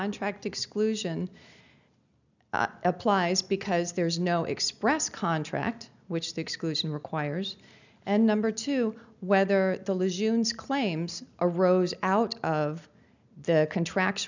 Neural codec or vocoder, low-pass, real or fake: none; 7.2 kHz; real